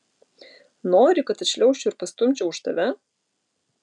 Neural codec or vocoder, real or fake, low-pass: none; real; 10.8 kHz